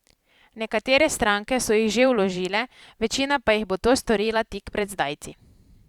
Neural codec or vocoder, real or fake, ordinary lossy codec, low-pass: vocoder, 44.1 kHz, 128 mel bands every 512 samples, BigVGAN v2; fake; Opus, 64 kbps; 19.8 kHz